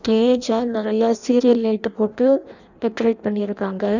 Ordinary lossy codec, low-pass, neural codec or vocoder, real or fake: none; 7.2 kHz; codec, 16 kHz in and 24 kHz out, 0.6 kbps, FireRedTTS-2 codec; fake